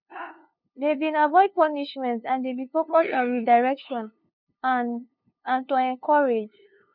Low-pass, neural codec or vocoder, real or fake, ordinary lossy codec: 5.4 kHz; codec, 16 kHz, 2 kbps, FunCodec, trained on LibriTTS, 25 frames a second; fake; none